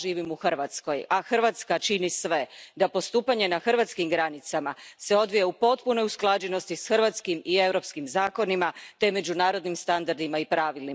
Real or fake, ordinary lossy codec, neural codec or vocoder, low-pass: real; none; none; none